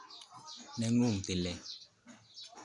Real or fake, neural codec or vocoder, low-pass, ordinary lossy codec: real; none; 10.8 kHz; none